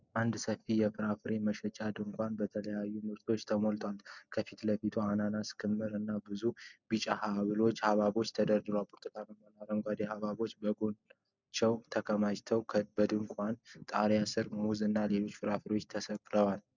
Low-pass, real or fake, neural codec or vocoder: 7.2 kHz; real; none